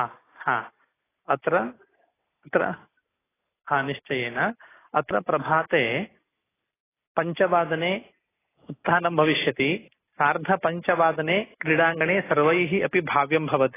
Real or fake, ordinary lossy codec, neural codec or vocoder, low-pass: real; AAC, 16 kbps; none; 3.6 kHz